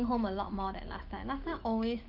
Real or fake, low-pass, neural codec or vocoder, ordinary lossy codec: fake; 5.4 kHz; autoencoder, 48 kHz, 128 numbers a frame, DAC-VAE, trained on Japanese speech; Opus, 32 kbps